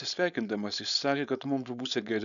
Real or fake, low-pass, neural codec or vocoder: fake; 7.2 kHz; codec, 16 kHz, 4.8 kbps, FACodec